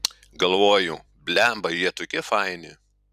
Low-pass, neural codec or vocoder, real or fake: 14.4 kHz; vocoder, 44.1 kHz, 128 mel bands every 256 samples, BigVGAN v2; fake